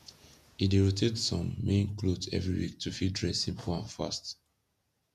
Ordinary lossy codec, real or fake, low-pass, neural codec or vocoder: none; fake; 14.4 kHz; vocoder, 48 kHz, 128 mel bands, Vocos